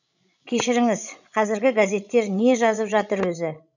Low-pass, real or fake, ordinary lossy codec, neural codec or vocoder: 7.2 kHz; fake; none; codec, 16 kHz, 16 kbps, FreqCodec, larger model